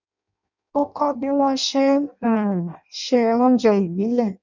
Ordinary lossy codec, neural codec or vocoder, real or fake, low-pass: none; codec, 16 kHz in and 24 kHz out, 0.6 kbps, FireRedTTS-2 codec; fake; 7.2 kHz